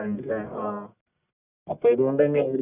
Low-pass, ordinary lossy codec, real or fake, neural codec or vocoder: 3.6 kHz; none; fake; codec, 44.1 kHz, 1.7 kbps, Pupu-Codec